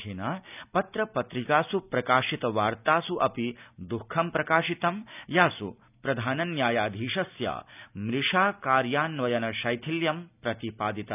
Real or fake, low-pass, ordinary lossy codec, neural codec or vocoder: real; 3.6 kHz; none; none